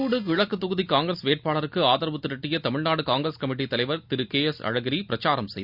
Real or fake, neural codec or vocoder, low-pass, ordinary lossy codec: real; none; 5.4 kHz; Opus, 64 kbps